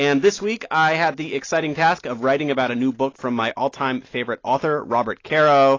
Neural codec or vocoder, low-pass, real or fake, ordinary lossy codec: none; 7.2 kHz; real; AAC, 32 kbps